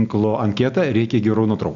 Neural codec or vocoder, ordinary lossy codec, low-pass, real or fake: none; Opus, 64 kbps; 7.2 kHz; real